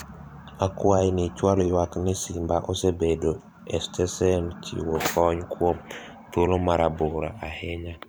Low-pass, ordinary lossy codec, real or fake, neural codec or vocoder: none; none; fake; vocoder, 44.1 kHz, 128 mel bands every 512 samples, BigVGAN v2